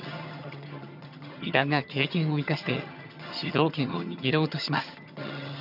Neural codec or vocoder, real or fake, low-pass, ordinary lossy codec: vocoder, 22.05 kHz, 80 mel bands, HiFi-GAN; fake; 5.4 kHz; none